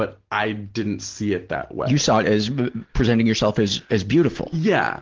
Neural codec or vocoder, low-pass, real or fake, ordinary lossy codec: none; 7.2 kHz; real; Opus, 16 kbps